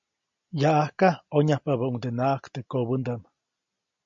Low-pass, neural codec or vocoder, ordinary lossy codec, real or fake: 7.2 kHz; none; MP3, 48 kbps; real